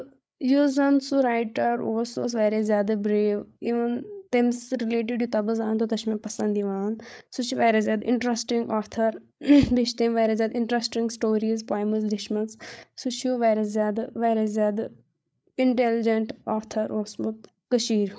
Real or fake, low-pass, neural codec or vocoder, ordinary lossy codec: fake; none; codec, 16 kHz, 8 kbps, FreqCodec, larger model; none